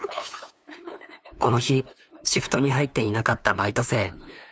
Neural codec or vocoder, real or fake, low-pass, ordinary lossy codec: codec, 16 kHz, 2 kbps, FunCodec, trained on LibriTTS, 25 frames a second; fake; none; none